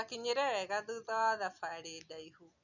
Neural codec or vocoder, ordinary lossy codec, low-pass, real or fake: none; none; 7.2 kHz; real